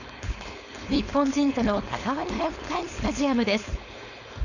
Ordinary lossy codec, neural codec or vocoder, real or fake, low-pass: none; codec, 16 kHz, 4.8 kbps, FACodec; fake; 7.2 kHz